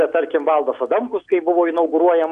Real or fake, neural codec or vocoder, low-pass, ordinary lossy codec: real; none; 9.9 kHz; AAC, 96 kbps